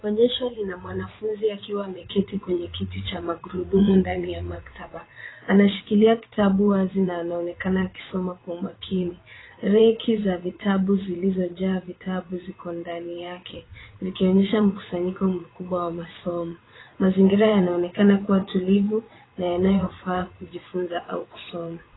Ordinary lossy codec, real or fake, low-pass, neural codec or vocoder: AAC, 16 kbps; fake; 7.2 kHz; vocoder, 22.05 kHz, 80 mel bands, WaveNeXt